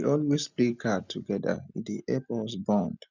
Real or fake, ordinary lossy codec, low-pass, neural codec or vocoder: real; none; 7.2 kHz; none